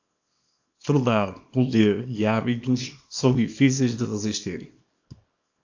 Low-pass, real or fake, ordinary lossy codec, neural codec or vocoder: 7.2 kHz; fake; AAC, 48 kbps; codec, 24 kHz, 0.9 kbps, WavTokenizer, small release